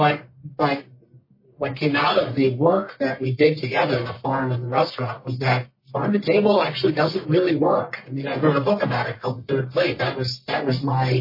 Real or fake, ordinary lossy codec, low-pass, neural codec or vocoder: fake; MP3, 24 kbps; 5.4 kHz; codec, 44.1 kHz, 1.7 kbps, Pupu-Codec